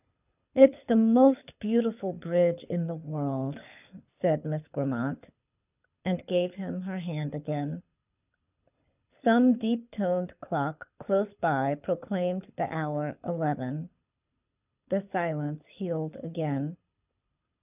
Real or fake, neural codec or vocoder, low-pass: fake; codec, 24 kHz, 6 kbps, HILCodec; 3.6 kHz